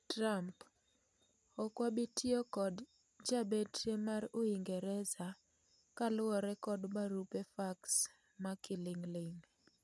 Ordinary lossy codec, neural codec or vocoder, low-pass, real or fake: none; none; none; real